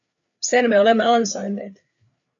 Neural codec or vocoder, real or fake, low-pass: codec, 16 kHz, 4 kbps, FreqCodec, larger model; fake; 7.2 kHz